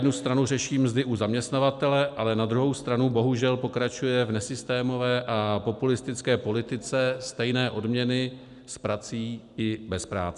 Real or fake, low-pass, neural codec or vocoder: real; 10.8 kHz; none